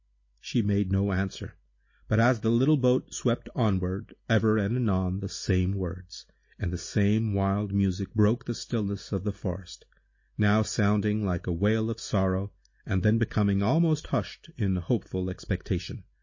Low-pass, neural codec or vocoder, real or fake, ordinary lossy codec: 7.2 kHz; none; real; MP3, 32 kbps